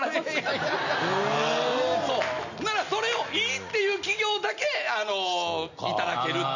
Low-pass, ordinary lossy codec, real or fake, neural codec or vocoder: 7.2 kHz; none; real; none